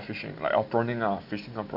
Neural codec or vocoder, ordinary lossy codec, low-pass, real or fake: none; none; 5.4 kHz; real